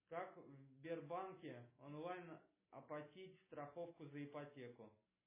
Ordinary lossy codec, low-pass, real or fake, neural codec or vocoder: MP3, 16 kbps; 3.6 kHz; real; none